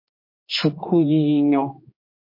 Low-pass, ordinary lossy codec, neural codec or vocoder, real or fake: 5.4 kHz; MP3, 32 kbps; codec, 16 kHz, 2 kbps, X-Codec, HuBERT features, trained on general audio; fake